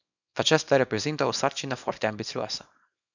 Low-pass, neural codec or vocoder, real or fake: 7.2 kHz; codec, 24 kHz, 0.9 kbps, WavTokenizer, small release; fake